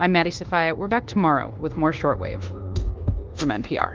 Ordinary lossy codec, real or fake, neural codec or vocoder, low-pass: Opus, 16 kbps; fake; codec, 24 kHz, 1.2 kbps, DualCodec; 7.2 kHz